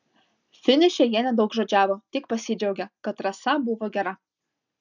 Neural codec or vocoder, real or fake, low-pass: none; real; 7.2 kHz